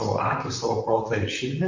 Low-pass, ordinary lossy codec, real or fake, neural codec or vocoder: 7.2 kHz; MP3, 32 kbps; fake; vocoder, 44.1 kHz, 128 mel bands, Pupu-Vocoder